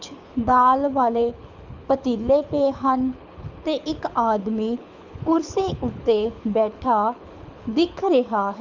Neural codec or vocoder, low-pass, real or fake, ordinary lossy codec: codec, 24 kHz, 6 kbps, HILCodec; 7.2 kHz; fake; Opus, 64 kbps